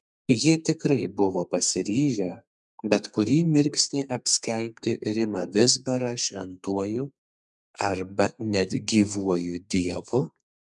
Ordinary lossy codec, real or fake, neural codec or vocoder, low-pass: MP3, 96 kbps; fake; codec, 32 kHz, 1.9 kbps, SNAC; 10.8 kHz